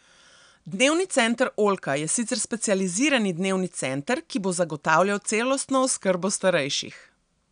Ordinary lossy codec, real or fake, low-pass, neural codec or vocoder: none; real; 9.9 kHz; none